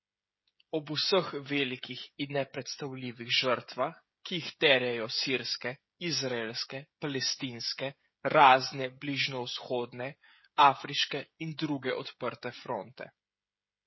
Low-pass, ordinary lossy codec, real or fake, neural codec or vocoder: 7.2 kHz; MP3, 24 kbps; fake; codec, 16 kHz, 16 kbps, FreqCodec, smaller model